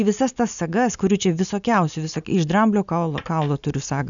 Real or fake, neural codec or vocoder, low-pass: real; none; 7.2 kHz